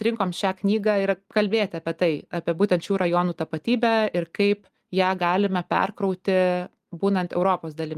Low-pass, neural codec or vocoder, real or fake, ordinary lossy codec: 14.4 kHz; none; real; Opus, 32 kbps